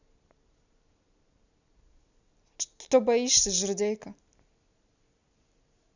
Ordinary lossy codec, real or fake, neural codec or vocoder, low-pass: none; real; none; 7.2 kHz